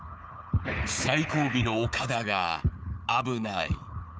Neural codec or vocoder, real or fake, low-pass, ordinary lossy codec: codec, 16 kHz, 4 kbps, FunCodec, trained on Chinese and English, 50 frames a second; fake; none; none